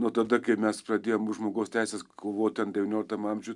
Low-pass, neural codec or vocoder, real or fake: 10.8 kHz; none; real